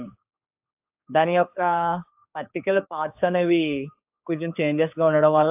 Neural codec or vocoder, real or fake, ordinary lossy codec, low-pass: codec, 16 kHz, 4 kbps, X-Codec, HuBERT features, trained on general audio; fake; AAC, 32 kbps; 3.6 kHz